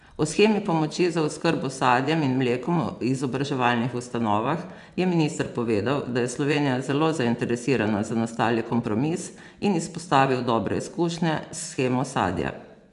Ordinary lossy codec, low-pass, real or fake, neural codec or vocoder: none; 10.8 kHz; fake; vocoder, 24 kHz, 100 mel bands, Vocos